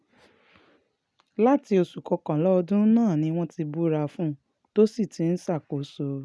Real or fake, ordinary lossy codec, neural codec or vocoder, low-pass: real; none; none; none